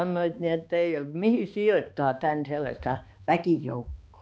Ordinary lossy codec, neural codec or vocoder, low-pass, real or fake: none; codec, 16 kHz, 2 kbps, X-Codec, HuBERT features, trained on balanced general audio; none; fake